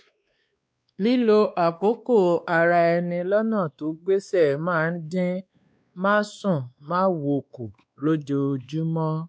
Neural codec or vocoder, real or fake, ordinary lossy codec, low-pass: codec, 16 kHz, 2 kbps, X-Codec, WavLM features, trained on Multilingual LibriSpeech; fake; none; none